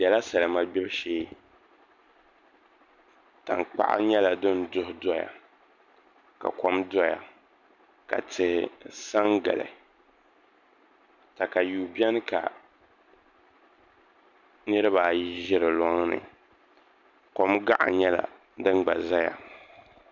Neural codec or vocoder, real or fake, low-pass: none; real; 7.2 kHz